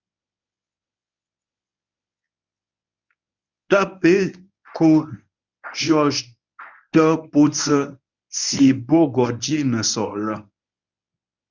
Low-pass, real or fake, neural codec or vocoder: 7.2 kHz; fake; codec, 24 kHz, 0.9 kbps, WavTokenizer, medium speech release version 1